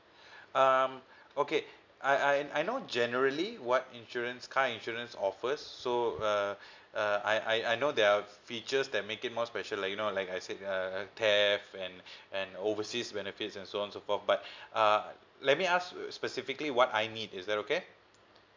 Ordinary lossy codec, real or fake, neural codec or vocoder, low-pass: MP3, 64 kbps; real; none; 7.2 kHz